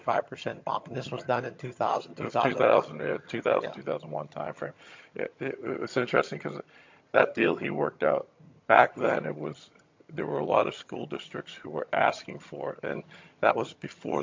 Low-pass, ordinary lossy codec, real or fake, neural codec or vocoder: 7.2 kHz; MP3, 48 kbps; fake; vocoder, 22.05 kHz, 80 mel bands, HiFi-GAN